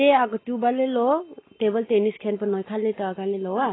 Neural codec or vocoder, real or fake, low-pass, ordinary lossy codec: none; real; 7.2 kHz; AAC, 16 kbps